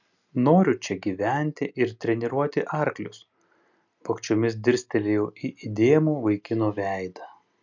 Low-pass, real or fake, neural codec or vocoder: 7.2 kHz; real; none